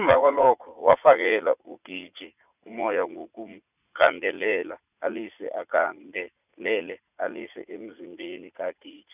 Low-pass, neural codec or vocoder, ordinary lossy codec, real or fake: 3.6 kHz; vocoder, 44.1 kHz, 80 mel bands, Vocos; none; fake